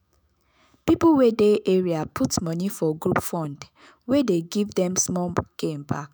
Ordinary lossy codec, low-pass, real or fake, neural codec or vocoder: none; none; fake; autoencoder, 48 kHz, 128 numbers a frame, DAC-VAE, trained on Japanese speech